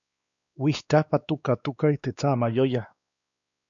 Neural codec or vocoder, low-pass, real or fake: codec, 16 kHz, 2 kbps, X-Codec, WavLM features, trained on Multilingual LibriSpeech; 7.2 kHz; fake